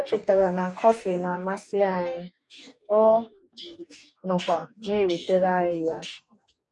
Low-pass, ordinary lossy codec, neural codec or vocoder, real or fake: 10.8 kHz; none; codec, 44.1 kHz, 2.6 kbps, DAC; fake